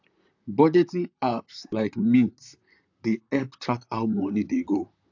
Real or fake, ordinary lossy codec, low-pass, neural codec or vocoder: fake; AAC, 48 kbps; 7.2 kHz; vocoder, 44.1 kHz, 128 mel bands, Pupu-Vocoder